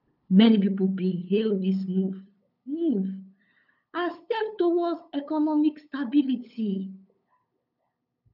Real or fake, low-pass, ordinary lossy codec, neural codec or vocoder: fake; 5.4 kHz; none; codec, 16 kHz, 8 kbps, FunCodec, trained on LibriTTS, 25 frames a second